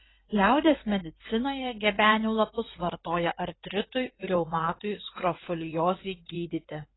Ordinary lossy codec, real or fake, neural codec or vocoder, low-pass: AAC, 16 kbps; fake; codec, 16 kHz in and 24 kHz out, 2.2 kbps, FireRedTTS-2 codec; 7.2 kHz